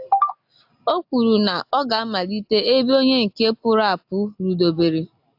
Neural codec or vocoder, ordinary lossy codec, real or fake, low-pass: none; AAC, 48 kbps; real; 5.4 kHz